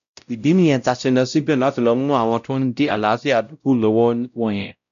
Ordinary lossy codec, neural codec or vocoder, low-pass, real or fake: none; codec, 16 kHz, 0.5 kbps, X-Codec, WavLM features, trained on Multilingual LibriSpeech; 7.2 kHz; fake